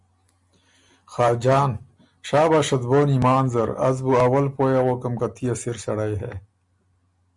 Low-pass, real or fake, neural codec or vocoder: 10.8 kHz; real; none